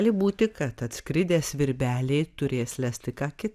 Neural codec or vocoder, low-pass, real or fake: none; 14.4 kHz; real